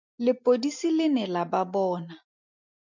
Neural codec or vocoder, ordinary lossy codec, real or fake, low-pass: none; MP3, 64 kbps; real; 7.2 kHz